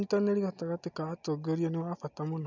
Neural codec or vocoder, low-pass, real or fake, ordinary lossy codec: none; 7.2 kHz; real; none